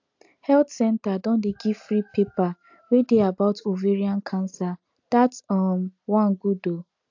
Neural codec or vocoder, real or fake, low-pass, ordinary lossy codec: none; real; 7.2 kHz; AAC, 48 kbps